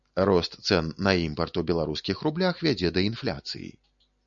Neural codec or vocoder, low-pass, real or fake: none; 7.2 kHz; real